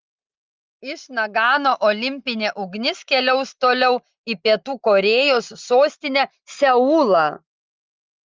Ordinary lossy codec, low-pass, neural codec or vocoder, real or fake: Opus, 24 kbps; 7.2 kHz; none; real